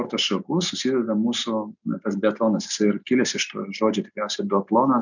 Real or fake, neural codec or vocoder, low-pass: real; none; 7.2 kHz